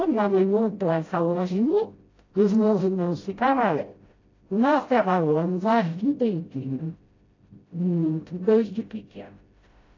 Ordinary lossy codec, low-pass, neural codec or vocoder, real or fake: AAC, 32 kbps; 7.2 kHz; codec, 16 kHz, 0.5 kbps, FreqCodec, smaller model; fake